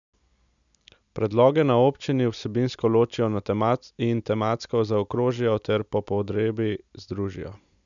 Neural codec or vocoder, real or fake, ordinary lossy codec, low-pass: none; real; none; 7.2 kHz